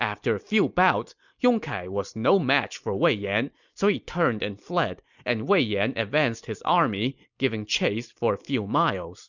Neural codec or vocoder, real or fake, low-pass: none; real; 7.2 kHz